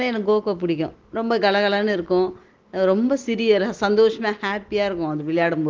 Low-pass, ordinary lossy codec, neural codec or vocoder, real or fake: 7.2 kHz; Opus, 16 kbps; none; real